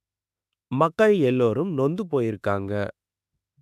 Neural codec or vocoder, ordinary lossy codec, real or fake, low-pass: autoencoder, 48 kHz, 32 numbers a frame, DAC-VAE, trained on Japanese speech; none; fake; 14.4 kHz